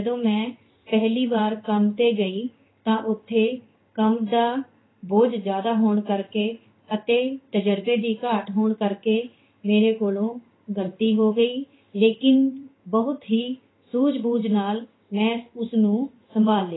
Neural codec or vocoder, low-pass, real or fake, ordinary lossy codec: codec, 16 kHz in and 24 kHz out, 1 kbps, XY-Tokenizer; 7.2 kHz; fake; AAC, 16 kbps